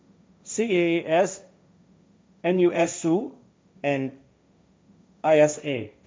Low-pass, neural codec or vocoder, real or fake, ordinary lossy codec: none; codec, 16 kHz, 1.1 kbps, Voila-Tokenizer; fake; none